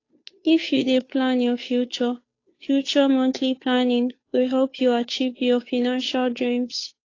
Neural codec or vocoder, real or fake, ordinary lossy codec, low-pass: codec, 16 kHz, 2 kbps, FunCodec, trained on Chinese and English, 25 frames a second; fake; AAC, 32 kbps; 7.2 kHz